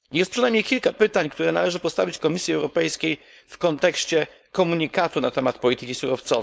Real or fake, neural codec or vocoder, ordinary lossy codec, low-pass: fake; codec, 16 kHz, 4.8 kbps, FACodec; none; none